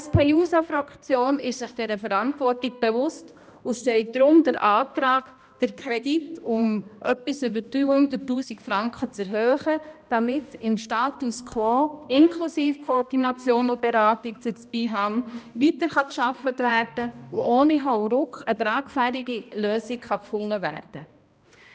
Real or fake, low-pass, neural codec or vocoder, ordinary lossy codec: fake; none; codec, 16 kHz, 1 kbps, X-Codec, HuBERT features, trained on balanced general audio; none